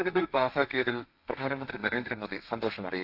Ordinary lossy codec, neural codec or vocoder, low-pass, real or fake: none; codec, 32 kHz, 1.9 kbps, SNAC; 5.4 kHz; fake